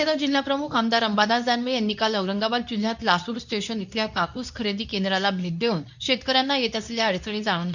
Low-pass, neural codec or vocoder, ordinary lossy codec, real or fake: 7.2 kHz; codec, 24 kHz, 0.9 kbps, WavTokenizer, medium speech release version 2; none; fake